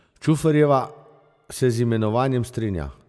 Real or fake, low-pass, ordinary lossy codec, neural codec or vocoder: real; none; none; none